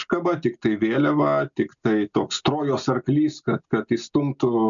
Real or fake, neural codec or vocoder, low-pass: real; none; 7.2 kHz